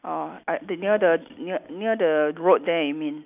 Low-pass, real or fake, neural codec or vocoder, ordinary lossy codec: 3.6 kHz; real; none; none